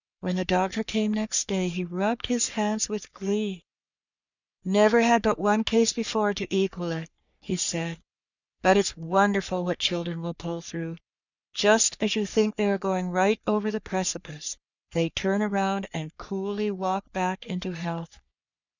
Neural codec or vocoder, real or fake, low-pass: codec, 44.1 kHz, 3.4 kbps, Pupu-Codec; fake; 7.2 kHz